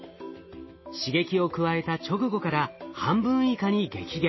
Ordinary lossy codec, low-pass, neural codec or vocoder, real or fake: MP3, 24 kbps; 7.2 kHz; none; real